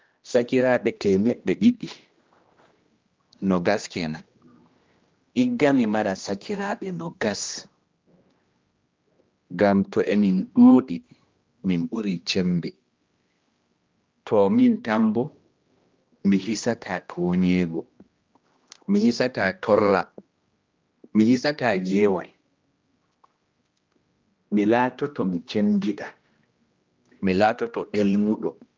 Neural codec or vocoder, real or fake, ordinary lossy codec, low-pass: codec, 16 kHz, 1 kbps, X-Codec, HuBERT features, trained on general audio; fake; Opus, 24 kbps; 7.2 kHz